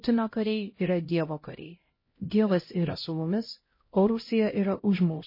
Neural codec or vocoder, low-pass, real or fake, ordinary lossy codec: codec, 16 kHz, 0.5 kbps, X-Codec, HuBERT features, trained on LibriSpeech; 5.4 kHz; fake; MP3, 24 kbps